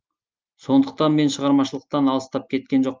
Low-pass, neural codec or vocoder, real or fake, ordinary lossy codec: 7.2 kHz; none; real; Opus, 32 kbps